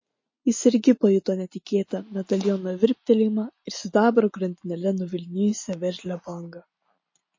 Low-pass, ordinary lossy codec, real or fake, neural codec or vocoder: 7.2 kHz; MP3, 32 kbps; real; none